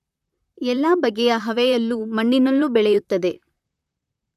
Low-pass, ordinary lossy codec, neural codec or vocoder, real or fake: 14.4 kHz; none; vocoder, 44.1 kHz, 128 mel bands, Pupu-Vocoder; fake